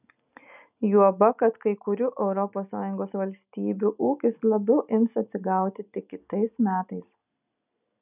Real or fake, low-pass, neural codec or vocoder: real; 3.6 kHz; none